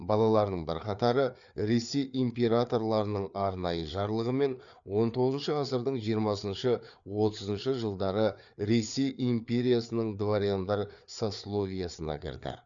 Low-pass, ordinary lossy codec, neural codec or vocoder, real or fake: 7.2 kHz; none; codec, 16 kHz, 4 kbps, FreqCodec, larger model; fake